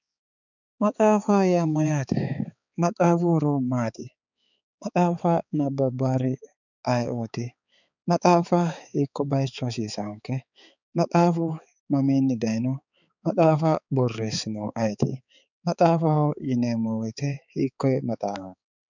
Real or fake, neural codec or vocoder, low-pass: fake; codec, 16 kHz, 4 kbps, X-Codec, HuBERT features, trained on balanced general audio; 7.2 kHz